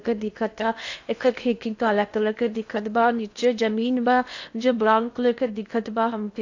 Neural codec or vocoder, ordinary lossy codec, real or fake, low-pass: codec, 16 kHz in and 24 kHz out, 0.8 kbps, FocalCodec, streaming, 65536 codes; AAC, 48 kbps; fake; 7.2 kHz